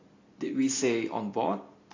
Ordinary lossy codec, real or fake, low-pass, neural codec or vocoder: AAC, 32 kbps; real; 7.2 kHz; none